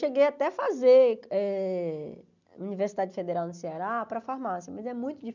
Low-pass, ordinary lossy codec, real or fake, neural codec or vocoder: 7.2 kHz; none; real; none